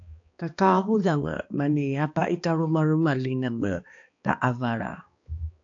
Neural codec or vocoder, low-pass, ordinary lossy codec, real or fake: codec, 16 kHz, 2 kbps, X-Codec, HuBERT features, trained on balanced general audio; 7.2 kHz; AAC, 48 kbps; fake